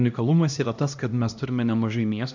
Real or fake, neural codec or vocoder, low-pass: fake; codec, 16 kHz, 1 kbps, X-Codec, HuBERT features, trained on LibriSpeech; 7.2 kHz